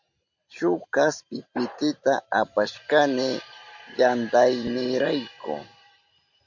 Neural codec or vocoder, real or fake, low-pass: vocoder, 44.1 kHz, 128 mel bands every 512 samples, BigVGAN v2; fake; 7.2 kHz